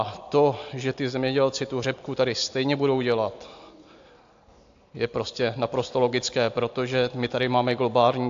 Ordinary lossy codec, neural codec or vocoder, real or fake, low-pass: AAC, 64 kbps; none; real; 7.2 kHz